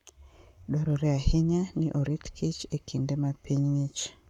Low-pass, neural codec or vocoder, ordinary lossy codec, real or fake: 19.8 kHz; codec, 44.1 kHz, 7.8 kbps, Pupu-Codec; none; fake